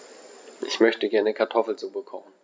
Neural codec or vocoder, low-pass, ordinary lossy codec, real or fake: none; none; none; real